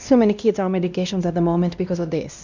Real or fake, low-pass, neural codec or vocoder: fake; 7.2 kHz; codec, 16 kHz, 1 kbps, X-Codec, WavLM features, trained on Multilingual LibriSpeech